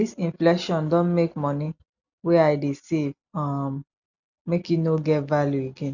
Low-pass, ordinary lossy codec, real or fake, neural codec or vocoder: 7.2 kHz; none; real; none